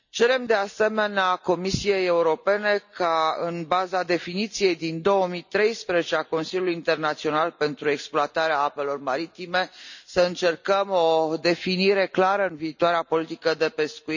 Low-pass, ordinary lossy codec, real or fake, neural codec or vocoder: 7.2 kHz; none; real; none